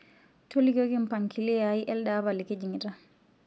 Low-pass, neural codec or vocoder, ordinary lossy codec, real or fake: none; none; none; real